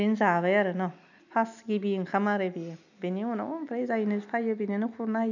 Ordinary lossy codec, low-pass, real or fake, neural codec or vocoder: none; 7.2 kHz; real; none